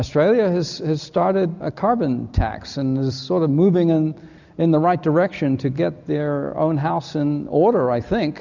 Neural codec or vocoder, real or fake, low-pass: none; real; 7.2 kHz